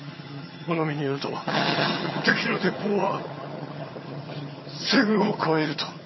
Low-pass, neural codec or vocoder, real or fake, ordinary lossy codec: 7.2 kHz; vocoder, 22.05 kHz, 80 mel bands, HiFi-GAN; fake; MP3, 24 kbps